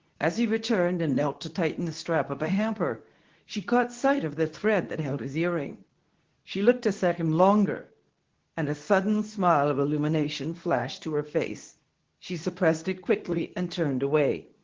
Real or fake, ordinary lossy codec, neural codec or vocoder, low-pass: fake; Opus, 16 kbps; codec, 24 kHz, 0.9 kbps, WavTokenizer, medium speech release version 2; 7.2 kHz